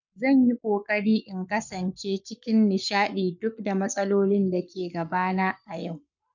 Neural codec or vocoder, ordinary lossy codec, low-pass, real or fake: codec, 44.1 kHz, 7.8 kbps, Pupu-Codec; none; 7.2 kHz; fake